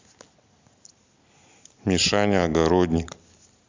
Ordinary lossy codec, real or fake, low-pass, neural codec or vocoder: MP3, 64 kbps; real; 7.2 kHz; none